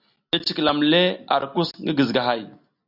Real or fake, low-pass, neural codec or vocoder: real; 5.4 kHz; none